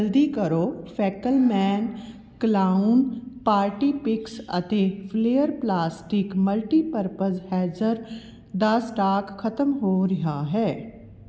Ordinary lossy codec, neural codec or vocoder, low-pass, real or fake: none; none; none; real